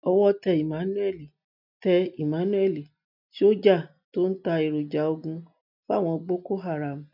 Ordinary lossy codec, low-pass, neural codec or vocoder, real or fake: none; 5.4 kHz; none; real